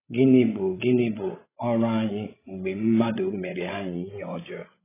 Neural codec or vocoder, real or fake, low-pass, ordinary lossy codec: codec, 16 kHz, 16 kbps, FreqCodec, larger model; fake; 3.6 kHz; AAC, 16 kbps